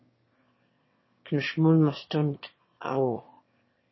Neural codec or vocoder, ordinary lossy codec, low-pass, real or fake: autoencoder, 22.05 kHz, a latent of 192 numbers a frame, VITS, trained on one speaker; MP3, 24 kbps; 7.2 kHz; fake